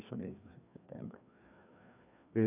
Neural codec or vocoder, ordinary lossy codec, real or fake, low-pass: codec, 16 kHz, 2 kbps, FreqCodec, larger model; none; fake; 3.6 kHz